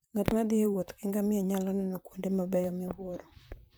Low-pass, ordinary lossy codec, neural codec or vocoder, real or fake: none; none; vocoder, 44.1 kHz, 128 mel bands, Pupu-Vocoder; fake